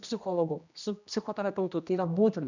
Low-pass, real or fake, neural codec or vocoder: 7.2 kHz; fake; codec, 16 kHz, 1 kbps, X-Codec, HuBERT features, trained on general audio